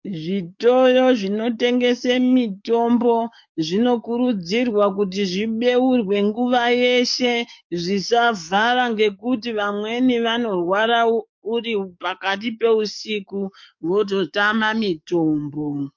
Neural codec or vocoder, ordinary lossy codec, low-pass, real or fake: codec, 44.1 kHz, 7.8 kbps, DAC; MP3, 48 kbps; 7.2 kHz; fake